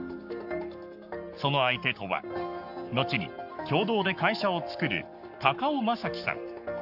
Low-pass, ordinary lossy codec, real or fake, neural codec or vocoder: 5.4 kHz; none; fake; codec, 44.1 kHz, 7.8 kbps, Pupu-Codec